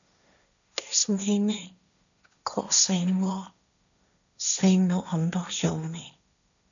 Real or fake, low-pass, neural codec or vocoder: fake; 7.2 kHz; codec, 16 kHz, 1.1 kbps, Voila-Tokenizer